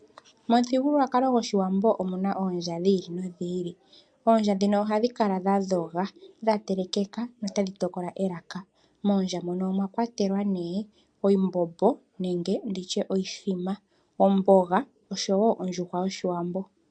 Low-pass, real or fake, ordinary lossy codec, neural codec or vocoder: 9.9 kHz; real; MP3, 64 kbps; none